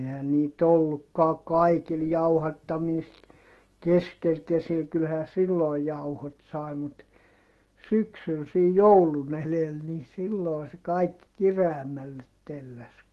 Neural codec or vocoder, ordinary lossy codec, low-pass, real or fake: autoencoder, 48 kHz, 128 numbers a frame, DAC-VAE, trained on Japanese speech; Opus, 16 kbps; 14.4 kHz; fake